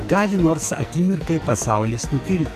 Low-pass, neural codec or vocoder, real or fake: 14.4 kHz; codec, 44.1 kHz, 2.6 kbps, SNAC; fake